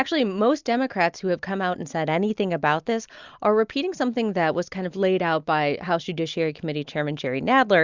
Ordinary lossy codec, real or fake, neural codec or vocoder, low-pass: Opus, 64 kbps; real; none; 7.2 kHz